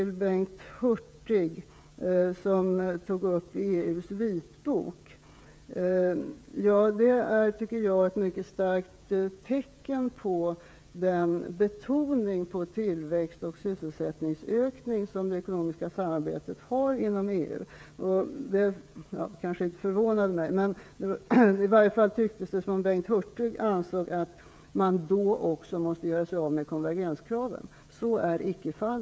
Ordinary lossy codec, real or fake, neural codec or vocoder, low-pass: none; fake; codec, 16 kHz, 16 kbps, FreqCodec, smaller model; none